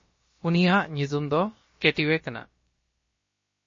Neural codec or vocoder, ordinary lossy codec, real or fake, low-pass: codec, 16 kHz, about 1 kbps, DyCAST, with the encoder's durations; MP3, 32 kbps; fake; 7.2 kHz